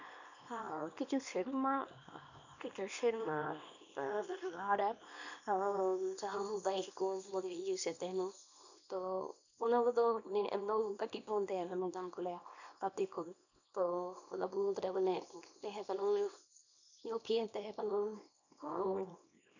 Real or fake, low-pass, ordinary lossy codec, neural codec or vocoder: fake; 7.2 kHz; none; codec, 24 kHz, 0.9 kbps, WavTokenizer, small release